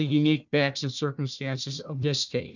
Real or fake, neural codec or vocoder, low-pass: fake; codec, 16 kHz, 1 kbps, FunCodec, trained on Chinese and English, 50 frames a second; 7.2 kHz